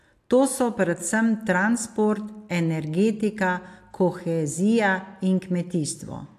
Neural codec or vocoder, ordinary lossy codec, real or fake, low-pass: none; AAC, 64 kbps; real; 14.4 kHz